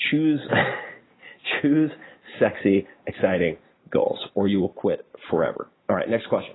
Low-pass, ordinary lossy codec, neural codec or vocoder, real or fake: 7.2 kHz; AAC, 16 kbps; none; real